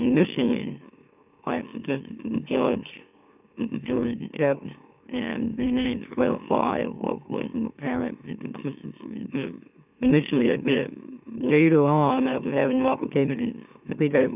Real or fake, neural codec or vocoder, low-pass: fake; autoencoder, 44.1 kHz, a latent of 192 numbers a frame, MeloTTS; 3.6 kHz